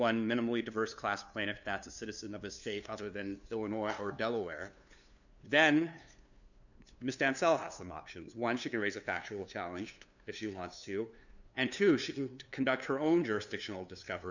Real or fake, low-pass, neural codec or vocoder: fake; 7.2 kHz; codec, 16 kHz, 2 kbps, FunCodec, trained on LibriTTS, 25 frames a second